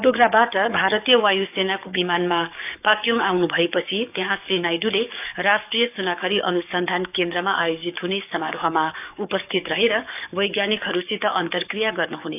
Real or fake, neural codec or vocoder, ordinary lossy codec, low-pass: fake; codec, 44.1 kHz, 7.8 kbps, DAC; none; 3.6 kHz